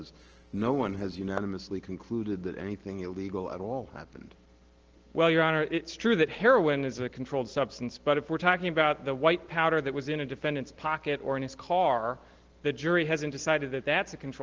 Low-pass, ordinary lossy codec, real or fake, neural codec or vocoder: 7.2 kHz; Opus, 16 kbps; real; none